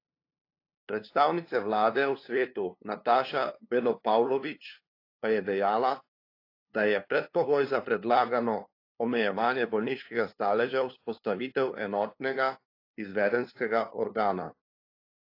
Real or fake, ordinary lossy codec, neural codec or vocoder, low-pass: fake; AAC, 32 kbps; codec, 16 kHz, 2 kbps, FunCodec, trained on LibriTTS, 25 frames a second; 5.4 kHz